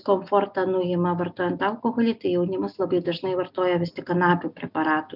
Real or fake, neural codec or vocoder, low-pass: real; none; 5.4 kHz